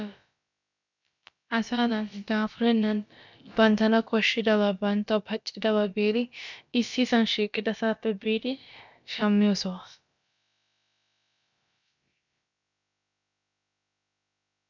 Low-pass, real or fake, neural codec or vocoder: 7.2 kHz; fake; codec, 16 kHz, about 1 kbps, DyCAST, with the encoder's durations